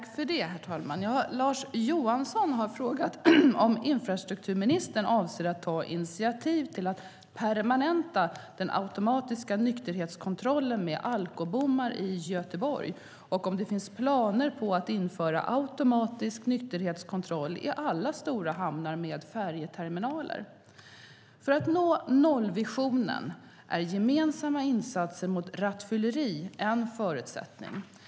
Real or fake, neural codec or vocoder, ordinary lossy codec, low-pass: real; none; none; none